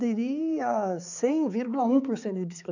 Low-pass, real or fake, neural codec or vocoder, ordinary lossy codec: 7.2 kHz; fake; codec, 16 kHz, 4 kbps, X-Codec, HuBERT features, trained on balanced general audio; none